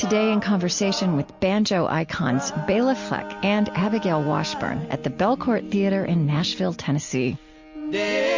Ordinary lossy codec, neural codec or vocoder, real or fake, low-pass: MP3, 48 kbps; none; real; 7.2 kHz